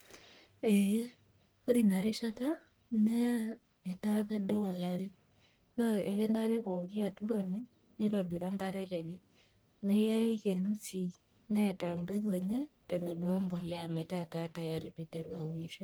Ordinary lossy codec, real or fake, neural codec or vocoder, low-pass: none; fake; codec, 44.1 kHz, 1.7 kbps, Pupu-Codec; none